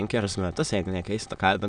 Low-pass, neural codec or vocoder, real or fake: 9.9 kHz; autoencoder, 22.05 kHz, a latent of 192 numbers a frame, VITS, trained on many speakers; fake